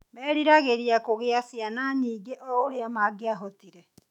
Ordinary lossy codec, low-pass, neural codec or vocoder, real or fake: none; 19.8 kHz; autoencoder, 48 kHz, 128 numbers a frame, DAC-VAE, trained on Japanese speech; fake